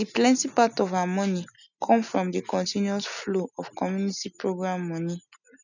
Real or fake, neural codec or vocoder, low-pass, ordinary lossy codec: real; none; 7.2 kHz; none